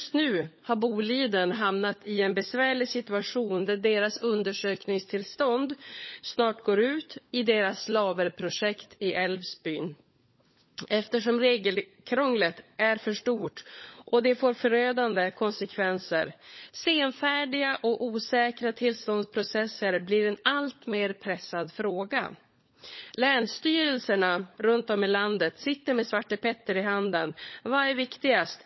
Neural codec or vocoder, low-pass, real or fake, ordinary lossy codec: codec, 16 kHz, 16 kbps, FunCodec, trained on LibriTTS, 50 frames a second; 7.2 kHz; fake; MP3, 24 kbps